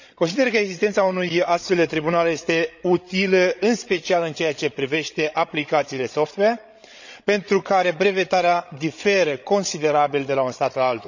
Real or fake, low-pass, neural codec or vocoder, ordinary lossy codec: fake; 7.2 kHz; codec, 16 kHz, 16 kbps, FreqCodec, larger model; none